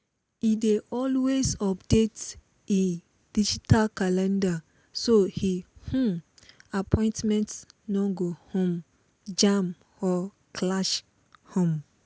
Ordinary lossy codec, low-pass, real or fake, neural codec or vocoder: none; none; real; none